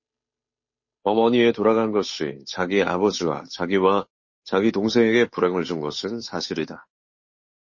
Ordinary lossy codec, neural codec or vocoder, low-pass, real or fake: MP3, 32 kbps; codec, 16 kHz, 8 kbps, FunCodec, trained on Chinese and English, 25 frames a second; 7.2 kHz; fake